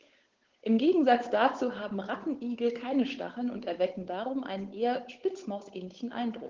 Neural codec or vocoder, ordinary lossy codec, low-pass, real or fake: codec, 16 kHz, 8 kbps, FunCodec, trained on Chinese and English, 25 frames a second; Opus, 16 kbps; 7.2 kHz; fake